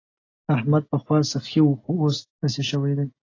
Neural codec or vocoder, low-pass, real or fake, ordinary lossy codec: none; 7.2 kHz; real; AAC, 32 kbps